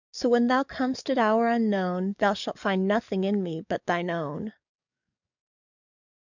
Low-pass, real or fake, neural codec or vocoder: 7.2 kHz; fake; codec, 44.1 kHz, 7.8 kbps, DAC